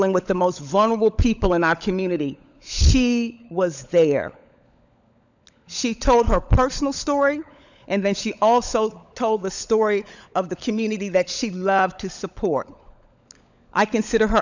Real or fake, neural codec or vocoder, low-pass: fake; codec, 16 kHz, 16 kbps, FunCodec, trained on LibriTTS, 50 frames a second; 7.2 kHz